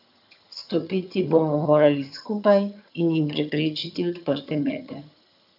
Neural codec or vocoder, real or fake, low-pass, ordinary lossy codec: vocoder, 22.05 kHz, 80 mel bands, HiFi-GAN; fake; 5.4 kHz; none